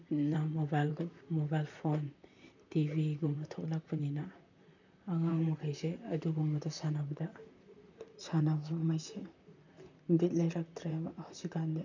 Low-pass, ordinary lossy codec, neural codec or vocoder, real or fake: 7.2 kHz; none; vocoder, 44.1 kHz, 128 mel bands, Pupu-Vocoder; fake